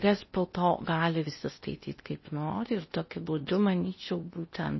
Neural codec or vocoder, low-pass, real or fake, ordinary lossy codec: codec, 16 kHz in and 24 kHz out, 0.6 kbps, FocalCodec, streaming, 4096 codes; 7.2 kHz; fake; MP3, 24 kbps